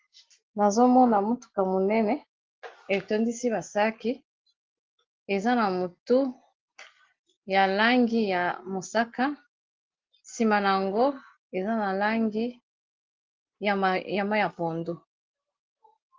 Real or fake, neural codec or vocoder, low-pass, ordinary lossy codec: real; none; 7.2 kHz; Opus, 16 kbps